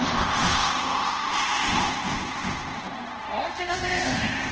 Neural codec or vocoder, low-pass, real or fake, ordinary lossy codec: codec, 24 kHz, 0.5 kbps, DualCodec; 7.2 kHz; fake; Opus, 16 kbps